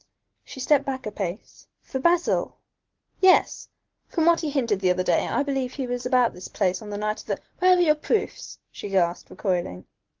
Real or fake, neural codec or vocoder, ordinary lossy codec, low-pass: real; none; Opus, 16 kbps; 7.2 kHz